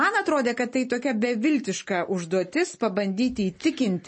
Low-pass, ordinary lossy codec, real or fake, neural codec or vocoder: 9.9 kHz; MP3, 32 kbps; real; none